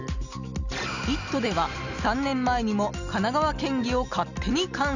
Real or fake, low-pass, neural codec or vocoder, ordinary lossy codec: real; 7.2 kHz; none; none